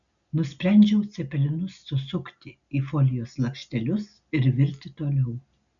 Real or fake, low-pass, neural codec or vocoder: real; 7.2 kHz; none